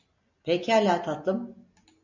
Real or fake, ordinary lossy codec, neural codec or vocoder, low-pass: real; MP3, 64 kbps; none; 7.2 kHz